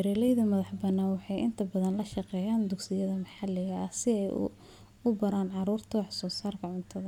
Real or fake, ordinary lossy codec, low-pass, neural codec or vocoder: real; none; 19.8 kHz; none